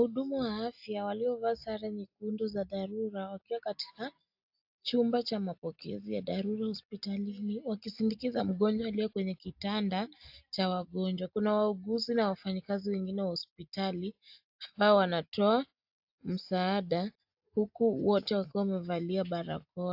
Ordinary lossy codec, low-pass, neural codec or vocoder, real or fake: AAC, 48 kbps; 5.4 kHz; none; real